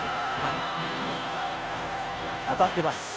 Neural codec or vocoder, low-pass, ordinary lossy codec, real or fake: codec, 16 kHz, 0.5 kbps, FunCodec, trained on Chinese and English, 25 frames a second; none; none; fake